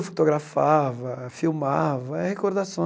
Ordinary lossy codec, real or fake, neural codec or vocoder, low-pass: none; real; none; none